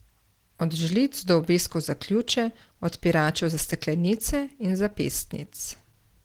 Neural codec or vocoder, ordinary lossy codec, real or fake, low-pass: none; Opus, 16 kbps; real; 19.8 kHz